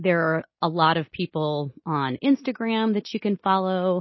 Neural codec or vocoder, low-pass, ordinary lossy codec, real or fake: none; 7.2 kHz; MP3, 24 kbps; real